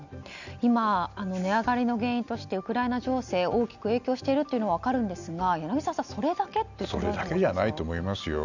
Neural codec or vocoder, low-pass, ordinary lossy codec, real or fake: none; 7.2 kHz; none; real